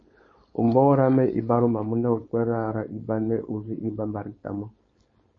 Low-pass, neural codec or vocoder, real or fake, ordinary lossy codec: 7.2 kHz; codec, 16 kHz, 4.8 kbps, FACodec; fake; MP3, 32 kbps